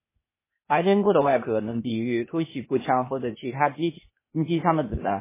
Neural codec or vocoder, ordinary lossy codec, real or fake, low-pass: codec, 16 kHz, 0.8 kbps, ZipCodec; MP3, 16 kbps; fake; 3.6 kHz